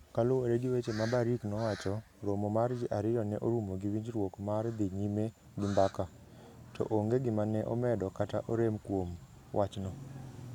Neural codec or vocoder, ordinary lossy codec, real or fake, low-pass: none; none; real; 19.8 kHz